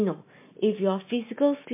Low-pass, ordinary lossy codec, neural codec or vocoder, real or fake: 3.6 kHz; MP3, 24 kbps; none; real